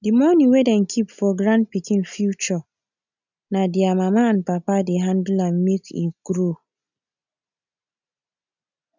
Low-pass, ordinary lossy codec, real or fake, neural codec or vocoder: 7.2 kHz; none; real; none